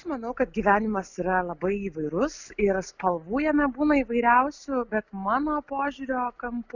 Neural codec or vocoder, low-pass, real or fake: none; 7.2 kHz; real